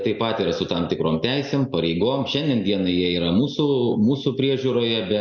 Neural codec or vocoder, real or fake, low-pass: none; real; 7.2 kHz